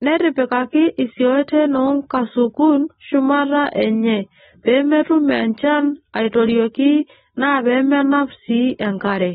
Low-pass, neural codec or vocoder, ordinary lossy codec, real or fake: 7.2 kHz; none; AAC, 16 kbps; real